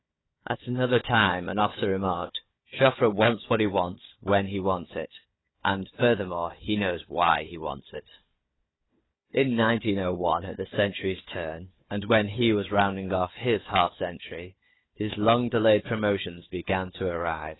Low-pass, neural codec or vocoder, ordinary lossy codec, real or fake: 7.2 kHz; codec, 16 kHz, 4 kbps, FunCodec, trained on Chinese and English, 50 frames a second; AAC, 16 kbps; fake